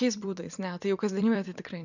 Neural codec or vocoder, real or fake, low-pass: vocoder, 44.1 kHz, 128 mel bands every 256 samples, BigVGAN v2; fake; 7.2 kHz